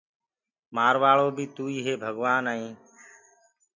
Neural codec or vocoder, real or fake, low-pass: none; real; 7.2 kHz